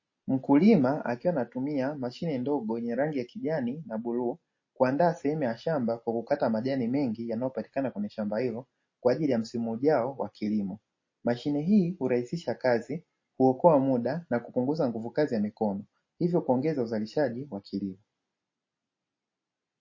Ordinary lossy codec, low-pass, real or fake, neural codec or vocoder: MP3, 32 kbps; 7.2 kHz; real; none